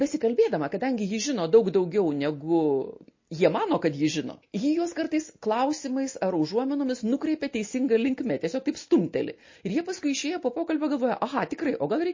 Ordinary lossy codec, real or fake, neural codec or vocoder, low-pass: MP3, 32 kbps; real; none; 7.2 kHz